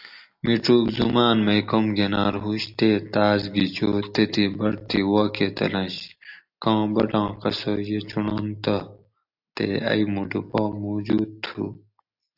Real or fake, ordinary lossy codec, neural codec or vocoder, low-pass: real; AAC, 48 kbps; none; 5.4 kHz